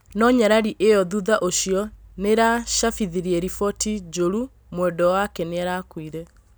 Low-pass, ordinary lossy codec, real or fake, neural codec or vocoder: none; none; real; none